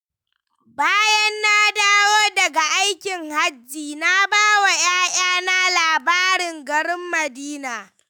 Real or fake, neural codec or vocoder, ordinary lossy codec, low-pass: fake; autoencoder, 48 kHz, 128 numbers a frame, DAC-VAE, trained on Japanese speech; none; none